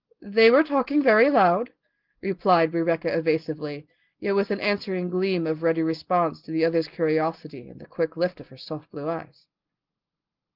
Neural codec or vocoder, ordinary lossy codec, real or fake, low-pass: none; Opus, 16 kbps; real; 5.4 kHz